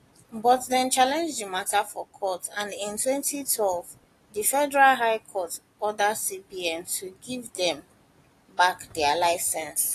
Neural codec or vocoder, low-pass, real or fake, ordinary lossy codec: none; 14.4 kHz; real; AAC, 48 kbps